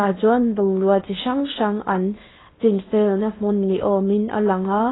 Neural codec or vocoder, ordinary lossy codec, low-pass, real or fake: codec, 16 kHz in and 24 kHz out, 0.8 kbps, FocalCodec, streaming, 65536 codes; AAC, 16 kbps; 7.2 kHz; fake